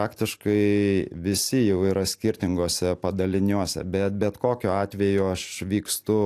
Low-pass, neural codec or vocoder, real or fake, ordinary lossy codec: 14.4 kHz; none; real; AAC, 64 kbps